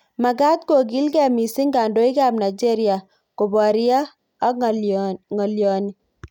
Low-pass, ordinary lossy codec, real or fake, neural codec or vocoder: 19.8 kHz; none; real; none